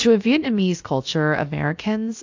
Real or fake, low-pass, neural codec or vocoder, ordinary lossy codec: fake; 7.2 kHz; codec, 16 kHz, 0.7 kbps, FocalCodec; AAC, 48 kbps